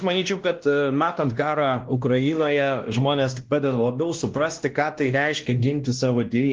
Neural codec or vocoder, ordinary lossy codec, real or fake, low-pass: codec, 16 kHz, 1 kbps, X-Codec, WavLM features, trained on Multilingual LibriSpeech; Opus, 16 kbps; fake; 7.2 kHz